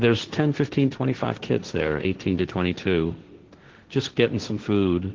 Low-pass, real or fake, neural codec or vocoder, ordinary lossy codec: 7.2 kHz; fake; codec, 16 kHz, 1.1 kbps, Voila-Tokenizer; Opus, 16 kbps